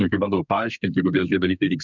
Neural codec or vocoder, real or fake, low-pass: codec, 32 kHz, 1.9 kbps, SNAC; fake; 7.2 kHz